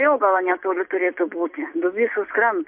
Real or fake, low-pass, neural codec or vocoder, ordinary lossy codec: real; 3.6 kHz; none; MP3, 32 kbps